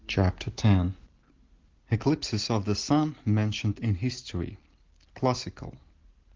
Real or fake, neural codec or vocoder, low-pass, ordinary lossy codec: real; none; 7.2 kHz; Opus, 16 kbps